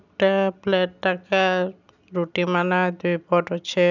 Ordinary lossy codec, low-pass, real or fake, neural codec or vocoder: none; 7.2 kHz; real; none